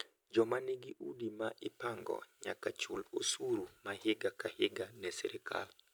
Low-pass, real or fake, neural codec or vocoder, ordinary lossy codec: none; real; none; none